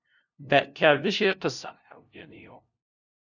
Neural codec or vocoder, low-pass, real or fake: codec, 16 kHz, 0.5 kbps, FunCodec, trained on LibriTTS, 25 frames a second; 7.2 kHz; fake